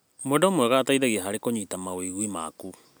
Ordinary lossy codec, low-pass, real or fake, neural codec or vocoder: none; none; real; none